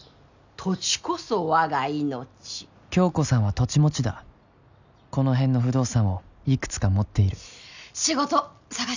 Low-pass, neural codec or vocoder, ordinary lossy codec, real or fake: 7.2 kHz; none; none; real